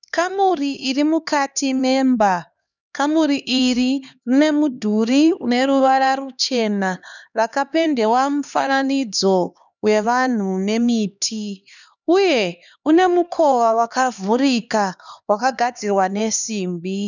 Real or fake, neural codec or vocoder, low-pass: fake; codec, 16 kHz, 2 kbps, X-Codec, HuBERT features, trained on LibriSpeech; 7.2 kHz